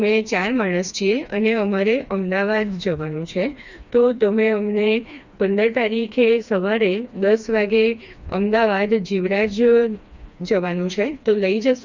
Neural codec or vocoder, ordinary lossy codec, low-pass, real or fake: codec, 16 kHz, 2 kbps, FreqCodec, smaller model; Opus, 64 kbps; 7.2 kHz; fake